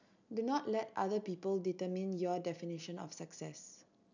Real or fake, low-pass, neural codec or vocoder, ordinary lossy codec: real; 7.2 kHz; none; none